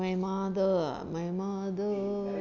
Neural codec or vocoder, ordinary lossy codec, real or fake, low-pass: none; none; real; 7.2 kHz